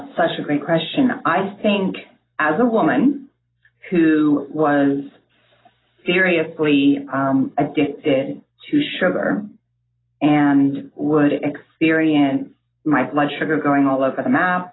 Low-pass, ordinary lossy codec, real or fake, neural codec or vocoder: 7.2 kHz; AAC, 16 kbps; real; none